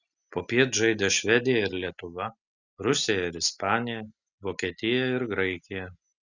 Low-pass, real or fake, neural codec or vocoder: 7.2 kHz; real; none